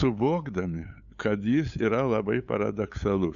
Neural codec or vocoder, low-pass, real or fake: codec, 16 kHz, 8 kbps, FunCodec, trained on LibriTTS, 25 frames a second; 7.2 kHz; fake